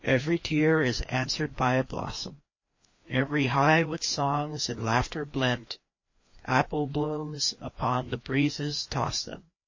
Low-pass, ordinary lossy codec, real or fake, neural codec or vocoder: 7.2 kHz; MP3, 32 kbps; fake; codec, 16 kHz, 2 kbps, FreqCodec, larger model